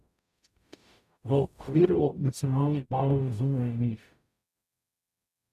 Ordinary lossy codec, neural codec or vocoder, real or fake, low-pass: none; codec, 44.1 kHz, 0.9 kbps, DAC; fake; 14.4 kHz